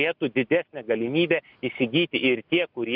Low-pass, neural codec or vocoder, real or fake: 5.4 kHz; none; real